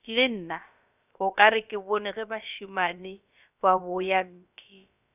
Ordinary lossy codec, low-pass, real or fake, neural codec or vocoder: none; 3.6 kHz; fake; codec, 16 kHz, about 1 kbps, DyCAST, with the encoder's durations